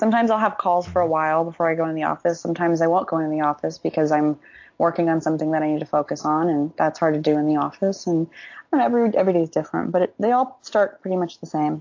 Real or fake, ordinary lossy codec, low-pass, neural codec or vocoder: real; AAC, 48 kbps; 7.2 kHz; none